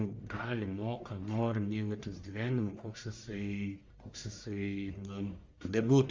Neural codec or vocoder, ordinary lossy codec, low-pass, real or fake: codec, 44.1 kHz, 1.7 kbps, Pupu-Codec; Opus, 32 kbps; 7.2 kHz; fake